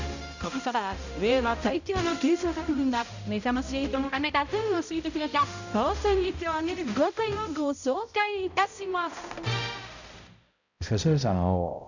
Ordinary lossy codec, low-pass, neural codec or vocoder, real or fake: none; 7.2 kHz; codec, 16 kHz, 0.5 kbps, X-Codec, HuBERT features, trained on balanced general audio; fake